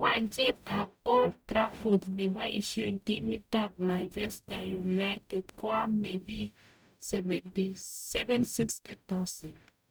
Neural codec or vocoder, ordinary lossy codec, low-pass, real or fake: codec, 44.1 kHz, 0.9 kbps, DAC; none; none; fake